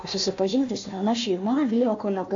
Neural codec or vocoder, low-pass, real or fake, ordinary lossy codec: codec, 16 kHz, 1 kbps, FunCodec, trained on Chinese and English, 50 frames a second; 7.2 kHz; fake; AAC, 48 kbps